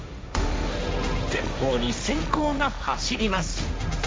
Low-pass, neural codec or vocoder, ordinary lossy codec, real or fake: none; codec, 16 kHz, 1.1 kbps, Voila-Tokenizer; none; fake